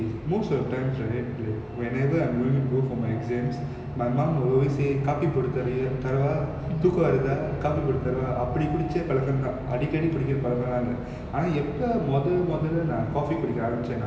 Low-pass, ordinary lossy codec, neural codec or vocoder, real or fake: none; none; none; real